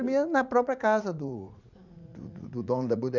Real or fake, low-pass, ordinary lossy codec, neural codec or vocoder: fake; 7.2 kHz; none; vocoder, 44.1 kHz, 80 mel bands, Vocos